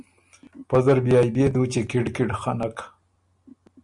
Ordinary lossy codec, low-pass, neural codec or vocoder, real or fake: Opus, 64 kbps; 10.8 kHz; none; real